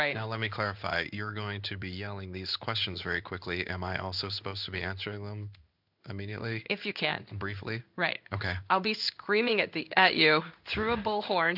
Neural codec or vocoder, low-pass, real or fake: codec, 16 kHz in and 24 kHz out, 1 kbps, XY-Tokenizer; 5.4 kHz; fake